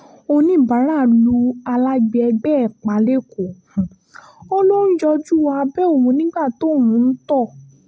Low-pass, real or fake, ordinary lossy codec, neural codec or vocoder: none; real; none; none